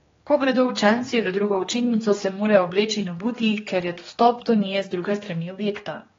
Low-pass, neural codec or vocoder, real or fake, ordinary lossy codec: 7.2 kHz; codec, 16 kHz, 2 kbps, X-Codec, HuBERT features, trained on general audio; fake; AAC, 24 kbps